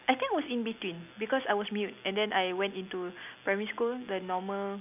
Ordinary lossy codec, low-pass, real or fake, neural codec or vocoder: none; 3.6 kHz; real; none